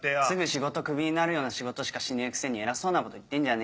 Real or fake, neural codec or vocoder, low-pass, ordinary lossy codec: real; none; none; none